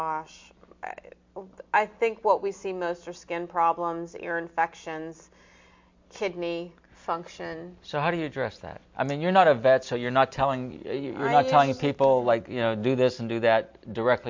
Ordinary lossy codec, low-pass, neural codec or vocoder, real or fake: MP3, 48 kbps; 7.2 kHz; none; real